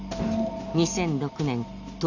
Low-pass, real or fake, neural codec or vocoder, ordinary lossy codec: 7.2 kHz; real; none; none